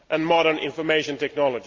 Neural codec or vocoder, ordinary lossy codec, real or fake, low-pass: none; Opus, 24 kbps; real; 7.2 kHz